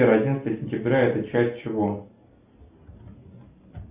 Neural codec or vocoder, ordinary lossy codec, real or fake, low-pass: none; Opus, 64 kbps; real; 3.6 kHz